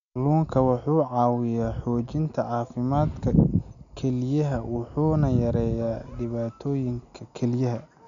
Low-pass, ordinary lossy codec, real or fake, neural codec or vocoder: 7.2 kHz; none; real; none